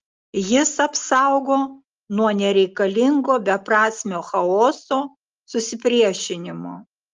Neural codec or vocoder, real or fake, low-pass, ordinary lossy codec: none; real; 7.2 kHz; Opus, 32 kbps